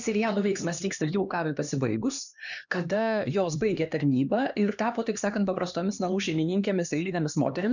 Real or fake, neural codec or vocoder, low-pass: fake; codec, 16 kHz, 2 kbps, X-Codec, HuBERT features, trained on LibriSpeech; 7.2 kHz